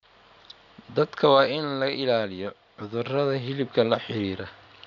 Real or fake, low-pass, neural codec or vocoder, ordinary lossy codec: real; 7.2 kHz; none; none